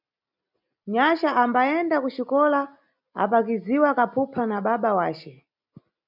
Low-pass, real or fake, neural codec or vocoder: 5.4 kHz; real; none